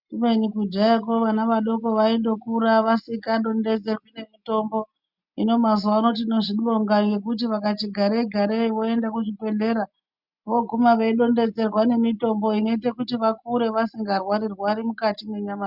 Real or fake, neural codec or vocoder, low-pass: real; none; 5.4 kHz